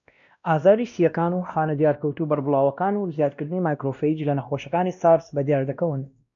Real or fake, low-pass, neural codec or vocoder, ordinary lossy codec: fake; 7.2 kHz; codec, 16 kHz, 1 kbps, X-Codec, WavLM features, trained on Multilingual LibriSpeech; MP3, 96 kbps